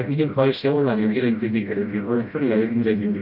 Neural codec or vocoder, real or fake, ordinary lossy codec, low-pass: codec, 16 kHz, 0.5 kbps, FreqCodec, smaller model; fake; none; 5.4 kHz